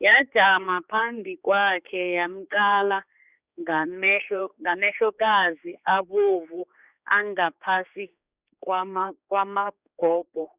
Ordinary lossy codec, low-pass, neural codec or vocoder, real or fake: Opus, 64 kbps; 3.6 kHz; codec, 16 kHz, 4 kbps, X-Codec, HuBERT features, trained on general audio; fake